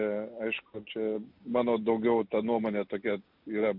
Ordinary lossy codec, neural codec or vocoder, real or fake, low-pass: MP3, 48 kbps; none; real; 5.4 kHz